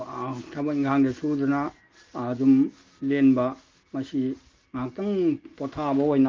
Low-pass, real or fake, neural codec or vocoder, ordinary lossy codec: 7.2 kHz; real; none; Opus, 24 kbps